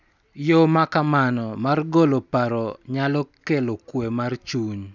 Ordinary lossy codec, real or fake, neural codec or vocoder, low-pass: none; real; none; 7.2 kHz